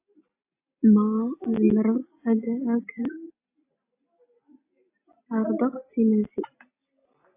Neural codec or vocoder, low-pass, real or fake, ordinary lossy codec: none; 3.6 kHz; real; AAC, 24 kbps